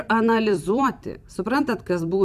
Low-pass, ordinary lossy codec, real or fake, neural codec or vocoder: 14.4 kHz; MP3, 96 kbps; fake; vocoder, 44.1 kHz, 128 mel bands every 256 samples, BigVGAN v2